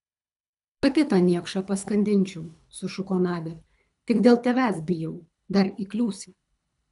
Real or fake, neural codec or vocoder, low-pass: fake; codec, 24 kHz, 3 kbps, HILCodec; 10.8 kHz